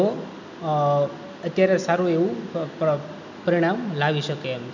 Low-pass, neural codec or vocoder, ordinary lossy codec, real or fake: 7.2 kHz; none; none; real